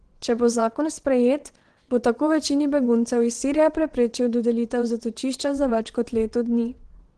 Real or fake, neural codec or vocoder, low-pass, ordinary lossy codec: fake; vocoder, 22.05 kHz, 80 mel bands, WaveNeXt; 9.9 kHz; Opus, 16 kbps